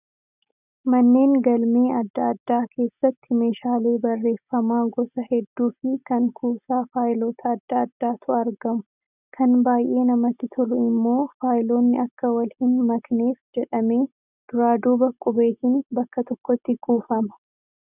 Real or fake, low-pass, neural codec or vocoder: real; 3.6 kHz; none